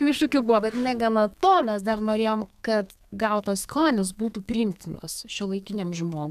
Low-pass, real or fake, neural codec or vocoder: 14.4 kHz; fake; codec, 32 kHz, 1.9 kbps, SNAC